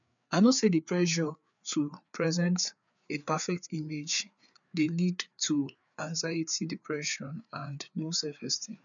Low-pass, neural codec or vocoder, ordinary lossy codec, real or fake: 7.2 kHz; codec, 16 kHz, 4 kbps, FreqCodec, larger model; none; fake